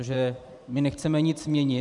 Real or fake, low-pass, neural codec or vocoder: fake; 10.8 kHz; vocoder, 44.1 kHz, 128 mel bands every 512 samples, BigVGAN v2